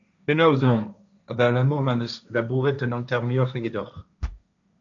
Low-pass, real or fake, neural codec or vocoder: 7.2 kHz; fake; codec, 16 kHz, 1.1 kbps, Voila-Tokenizer